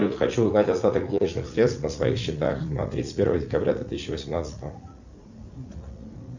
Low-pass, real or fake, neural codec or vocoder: 7.2 kHz; fake; vocoder, 44.1 kHz, 80 mel bands, Vocos